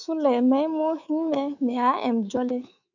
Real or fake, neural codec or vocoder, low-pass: fake; codec, 24 kHz, 3.1 kbps, DualCodec; 7.2 kHz